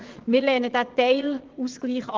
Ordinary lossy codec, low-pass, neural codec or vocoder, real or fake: Opus, 16 kbps; 7.2 kHz; vocoder, 22.05 kHz, 80 mel bands, Vocos; fake